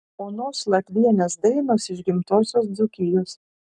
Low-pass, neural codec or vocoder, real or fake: 10.8 kHz; codec, 44.1 kHz, 7.8 kbps, Pupu-Codec; fake